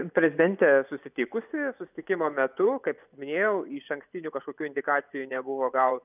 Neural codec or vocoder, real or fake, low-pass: vocoder, 24 kHz, 100 mel bands, Vocos; fake; 3.6 kHz